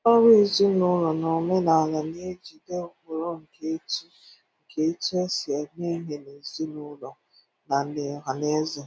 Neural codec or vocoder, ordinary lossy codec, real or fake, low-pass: none; none; real; none